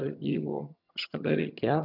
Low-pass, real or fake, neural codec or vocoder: 5.4 kHz; fake; vocoder, 22.05 kHz, 80 mel bands, HiFi-GAN